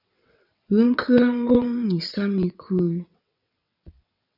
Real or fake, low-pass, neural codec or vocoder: fake; 5.4 kHz; vocoder, 22.05 kHz, 80 mel bands, WaveNeXt